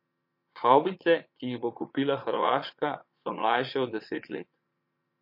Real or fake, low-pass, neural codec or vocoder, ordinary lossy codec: fake; 5.4 kHz; vocoder, 22.05 kHz, 80 mel bands, Vocos; MP3, 32 kbps